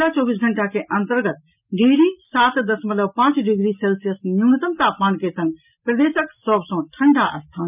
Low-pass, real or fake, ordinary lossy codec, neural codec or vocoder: 3.6 kHz; real; none; none